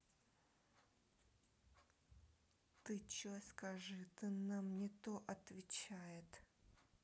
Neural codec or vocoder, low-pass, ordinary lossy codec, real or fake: none; none; none; real